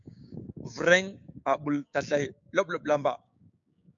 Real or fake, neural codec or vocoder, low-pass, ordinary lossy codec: fake; codec, 16 kHz, 6 kbps, DAC; 7.2 kHz; MP3, 64 kbps